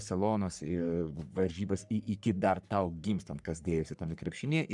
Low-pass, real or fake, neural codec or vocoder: 10.8 kHz; fake; codec, 44.1 kHz, 3.4 kbps, Pupu-Codec